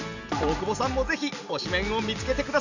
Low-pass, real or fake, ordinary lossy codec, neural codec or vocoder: 7.2 kHz; real; none; none